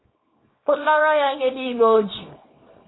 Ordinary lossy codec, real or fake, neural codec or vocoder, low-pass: AAC, 16 kbps; fake; codec, 24 kHz, 0.9 kbps, WavTokenizer, small release; 7.2 kHz